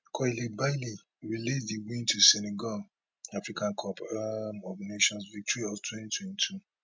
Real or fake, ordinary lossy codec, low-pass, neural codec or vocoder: real; none; none; none